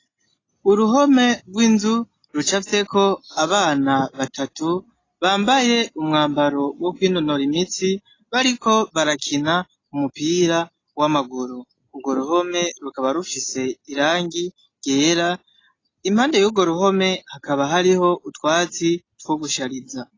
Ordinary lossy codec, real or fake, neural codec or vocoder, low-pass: AAC, 32 kbps; real; none; 7.2 kHz